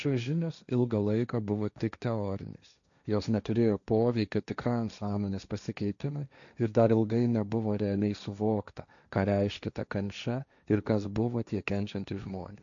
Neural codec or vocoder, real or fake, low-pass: codec, 16 kHz, 1.1 kbps, Voila-Tokenizer; fake; 7.2 kHz